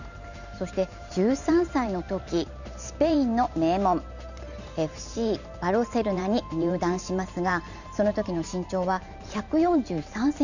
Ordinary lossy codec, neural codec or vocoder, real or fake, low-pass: none; vocoder, 44.1 kHz, 128 mel bands every 512 samples, BigVGAN v2; fake; 7.2 kHz